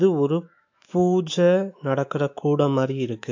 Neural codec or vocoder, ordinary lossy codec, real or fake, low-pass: autoencoder, 48 kHz, 128 numbers a frame, DAC-VAE, trained on Japanese speech; AAC, 48 kbps; fake; 7.2 kHz